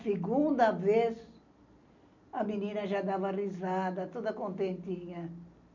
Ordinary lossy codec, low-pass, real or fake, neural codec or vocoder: none; 7.2 kHz; real; none